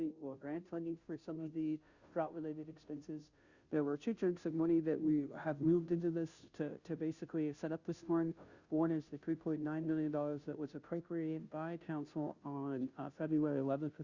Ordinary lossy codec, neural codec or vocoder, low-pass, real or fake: Opus, 64 kbps; codec, 16 kHz, 0.5 kbps, FunCodec, trained on Chinese and English, 25 frames a second; 7.2 kHz; fake